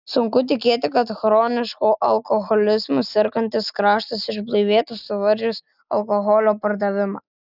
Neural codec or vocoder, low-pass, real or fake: none; 5.4 kHz; real